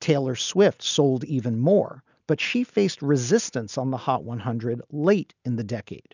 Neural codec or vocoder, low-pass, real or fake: none; 7.2 kHz; real